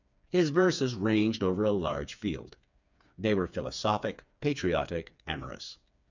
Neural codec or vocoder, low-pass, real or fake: codec, 16 kHz, 4 kbps, FreqCodec, smaller model; 7.2 kHz; fake